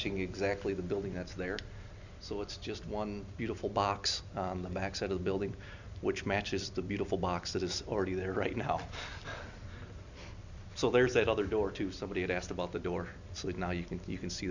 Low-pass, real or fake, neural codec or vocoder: 7.2 kHz; real; none